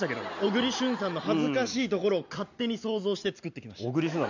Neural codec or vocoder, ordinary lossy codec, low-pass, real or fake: none; none; 7.2 kHz; real